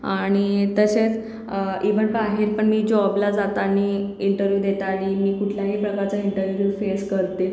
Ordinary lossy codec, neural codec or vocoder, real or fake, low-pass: none; none; real; none